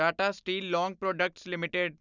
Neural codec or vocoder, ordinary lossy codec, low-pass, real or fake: none; none; 7.2 kHz; real